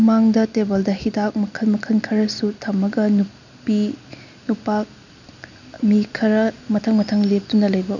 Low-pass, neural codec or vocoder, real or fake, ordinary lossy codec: 7.2 kHz; none; real; none